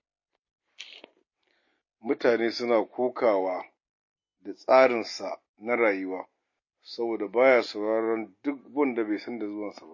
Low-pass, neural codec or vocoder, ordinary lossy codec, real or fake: 7.2 kHz; none; MP3, 32 kbps; real